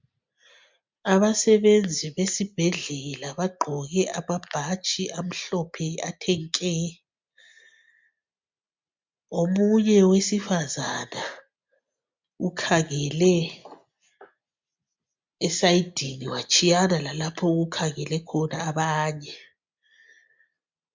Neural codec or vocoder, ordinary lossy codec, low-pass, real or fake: none; MP3, 64 kbps; 7.2 kHz; real